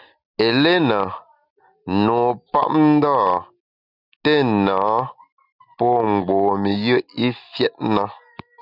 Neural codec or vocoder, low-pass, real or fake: none; 5.4 kHz; real